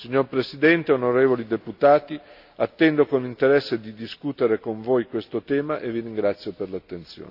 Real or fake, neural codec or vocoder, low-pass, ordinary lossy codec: real; none; 5.4 kHz; AAC, 48 kbps